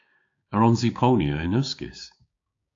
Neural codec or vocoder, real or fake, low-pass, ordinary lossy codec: codec, 16 kHz, 6 kbps, DAC; fake; 7.2 kHz; AAC, 48 kbps